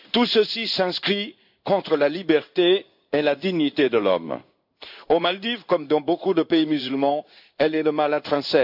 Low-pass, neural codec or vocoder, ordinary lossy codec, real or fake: 5.4 kHz; codec, 16 kHz in and 24 kHz out, 1 kbps, XY-Tokenizer; none; fake